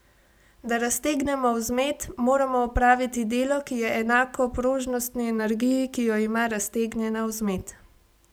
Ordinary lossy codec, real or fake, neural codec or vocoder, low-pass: none; real; none; none